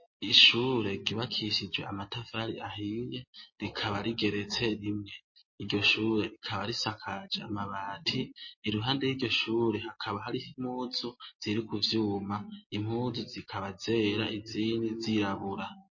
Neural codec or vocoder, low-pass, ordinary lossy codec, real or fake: none; 7.2 kHz; MP3, 32 kbps; real